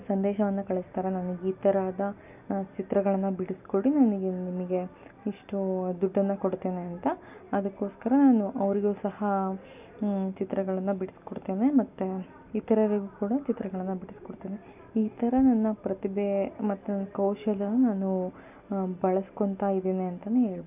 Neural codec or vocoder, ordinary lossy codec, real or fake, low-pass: none; none; real; 3.6 kHz